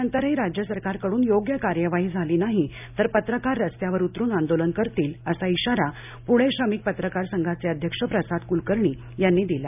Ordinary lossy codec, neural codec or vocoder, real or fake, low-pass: none; none; real; 3.6 kHz